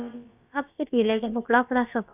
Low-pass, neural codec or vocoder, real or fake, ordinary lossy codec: 3.6 kHz; codec, 16 kHz, about 1 kbps, DyCAST, with the encoder's durations; fake; none